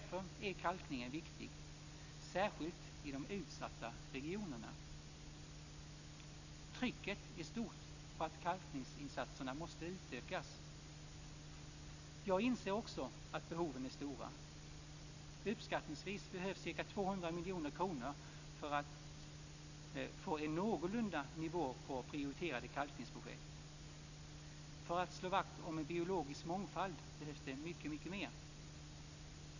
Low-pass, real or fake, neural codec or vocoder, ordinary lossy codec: 7.2 kHz; real; none; none